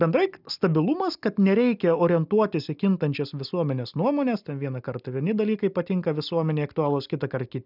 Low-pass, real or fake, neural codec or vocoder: 5.4 kHz; real; none